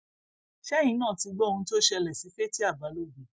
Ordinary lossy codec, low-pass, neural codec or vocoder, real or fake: none; none; none; real